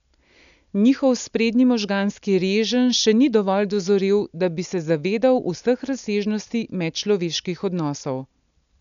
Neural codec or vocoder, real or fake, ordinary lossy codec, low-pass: none; real; none; 7.2 kHz